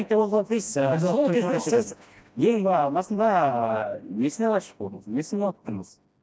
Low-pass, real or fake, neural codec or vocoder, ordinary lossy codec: none; fake; codec, 16 kHz, 1 kbps, FreqCodec, smaller model; none